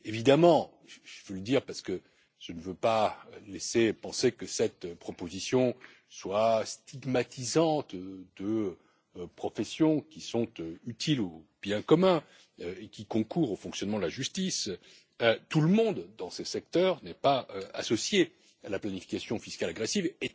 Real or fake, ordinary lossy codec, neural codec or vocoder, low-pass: real; none; none; none